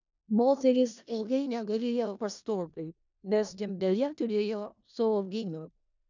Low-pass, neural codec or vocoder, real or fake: 7.2 kHz; codec, 16 kHz in and 24 kHz out, 0.4 kbps, LongCat-Audio-Codec, four codebook decoder; fake